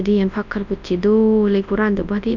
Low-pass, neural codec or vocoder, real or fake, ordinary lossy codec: 7.2 kHz; codec, 24 kHz, 0.9 kbps, WavTokenizer, large speech release; fake; none